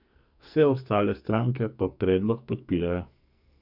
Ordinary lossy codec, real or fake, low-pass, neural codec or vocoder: none; fake; 5.4 kHz; codec, 44.1 kHz, 2.6 kbps, SNAC